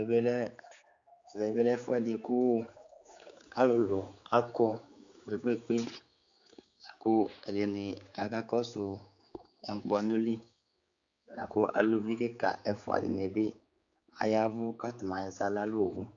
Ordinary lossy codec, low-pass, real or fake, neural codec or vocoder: Opus, 64 kbps; 7.2 kHz; fake; codec, 16 kHz, 4 kbps, X-Codec, HuBERT features, trained on general audio